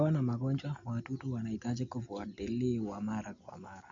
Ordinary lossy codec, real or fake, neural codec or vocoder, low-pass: MP3, 48 kbps; real; none; 7.2 kHz